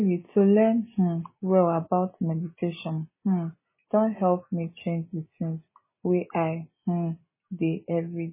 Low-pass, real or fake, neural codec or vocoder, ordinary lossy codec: 3.6 kHz; real; none; MP3, 16 kbps